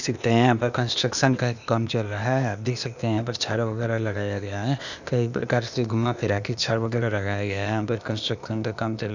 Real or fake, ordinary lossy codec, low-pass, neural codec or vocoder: fake; none; 7.2 kHz; codec, 16 kHz, 0.8 kbps, ZipCodec